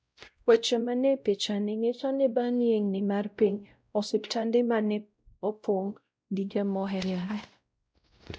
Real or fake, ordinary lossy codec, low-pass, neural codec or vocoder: fake; none; none; codec, 16 kHz, 0.5 kbps, X-Codec, WavLM features, trained on Multilingual LibriSpeech